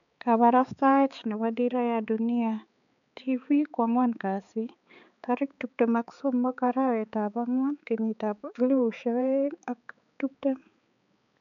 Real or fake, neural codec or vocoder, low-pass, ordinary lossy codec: fake; codec, 16 kHz, 4 kbps, X-Codec, HuBERT features, trained on balanced general audio; 7.2 kHz; none